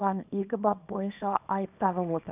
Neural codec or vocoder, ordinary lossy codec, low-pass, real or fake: codec, 24 kHz, 0.9 kbps, WavTokenizer, small release; none; 3.6 kHz; fake